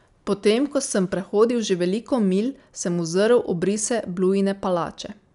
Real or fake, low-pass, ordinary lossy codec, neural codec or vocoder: real; 10.8 kHz; none; none